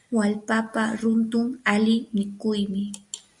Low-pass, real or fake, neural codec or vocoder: 10.8 kHz; real; none